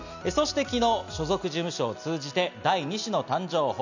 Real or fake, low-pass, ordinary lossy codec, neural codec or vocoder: real; 7.2 kHz; none; none